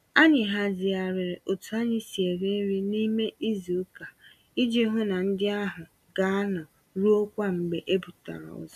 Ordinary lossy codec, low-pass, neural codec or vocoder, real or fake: none; 14.4 kHz; none; real